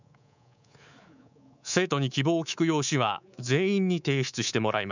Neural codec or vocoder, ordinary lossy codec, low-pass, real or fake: codec, 24 kHz, 3.1 kbps, DualCodec; none; 7.2 kHz; fake